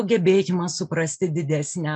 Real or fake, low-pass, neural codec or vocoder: real; 10.8 kHz; none